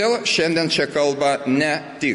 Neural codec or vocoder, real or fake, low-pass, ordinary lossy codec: none; real; 10.8 kHz; MP3, 48 kbps